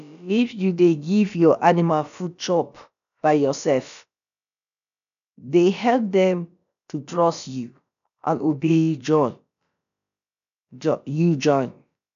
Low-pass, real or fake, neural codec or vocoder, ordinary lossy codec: 7.2 kHz; fake; codec, 16 kHz, about 1 kbps, DyCAST, with the encoder's durations; none